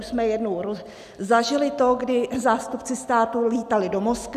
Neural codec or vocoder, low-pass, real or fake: none; 14.4 kHz; real